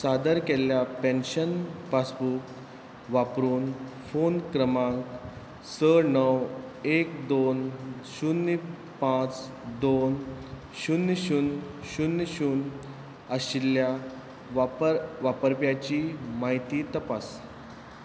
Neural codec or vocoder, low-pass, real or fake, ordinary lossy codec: none; none; real; none